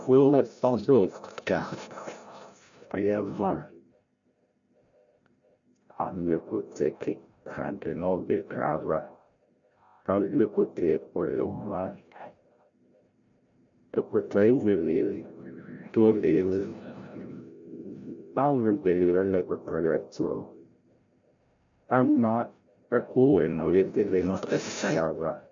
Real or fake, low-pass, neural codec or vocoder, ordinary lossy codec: fake; 7.2 kHz; codec, 16 kHz, 0.5 kbps, FreqCodec, larger model; MP3, 64 kbps